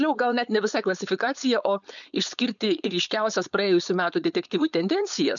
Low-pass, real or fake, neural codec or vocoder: 7.2 kHz; fake; codec, 16 kHz, 4.8 kbps, FACodec